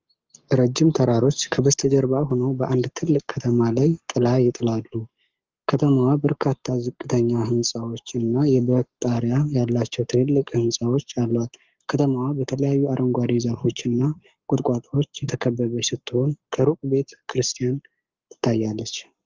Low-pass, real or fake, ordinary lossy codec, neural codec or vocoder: 7.2 kHz; fake; Opus, 24 kbps; codec, 44.1 kHz, 7.8 kbps, Pupu-Codec